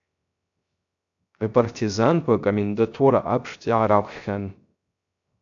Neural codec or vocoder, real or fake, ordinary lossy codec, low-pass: codec, 16 kHz, 0.3 kbps, FocalCodec; fake; AAC, 64 kbps; 7.2 kHz